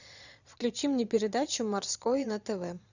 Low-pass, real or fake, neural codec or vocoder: 7.2 kHz; fake; vocoder, 22.05 kHz, 80 mel bands, Vocos